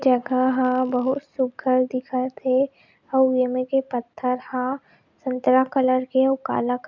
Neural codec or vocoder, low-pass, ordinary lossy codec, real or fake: none; 7.2 kHz; none; real